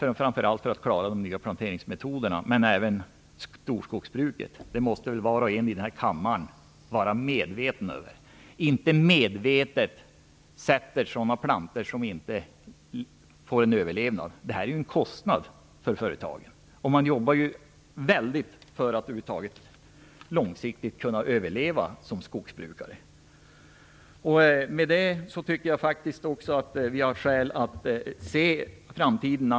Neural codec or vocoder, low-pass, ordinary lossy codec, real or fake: none; none; none; real